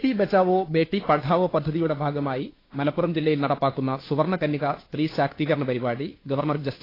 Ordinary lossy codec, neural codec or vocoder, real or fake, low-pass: AAC, 24 kbps; codec, 16 kHz, 2 kbps, FunCodec, trained on Chinese and English, 25 frames a second; fake; 5.4 kHz